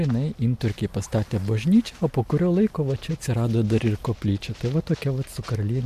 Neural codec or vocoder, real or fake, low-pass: none; real; 14.4 kHz